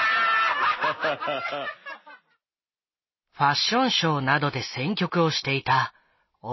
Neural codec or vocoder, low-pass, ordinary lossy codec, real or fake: none; 7.2 kHz; MP3, 24 kbps; real